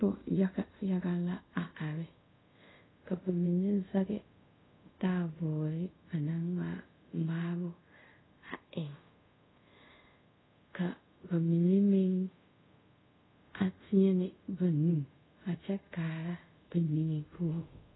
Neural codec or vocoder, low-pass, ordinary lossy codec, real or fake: codec, 24 kHz, 0.5 kbps, DualCodec; 7.2 kHz; AAC, 16 kbps; fake